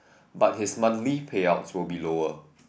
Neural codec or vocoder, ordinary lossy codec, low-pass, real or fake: none; none; none; real